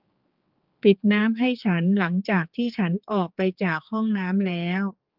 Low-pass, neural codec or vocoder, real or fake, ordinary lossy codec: 5.4 kHz; codec, 16 kHz, 4 kbps, X-Codec, HuBERT features, trained on general audio; fake; Opus, 32 kbps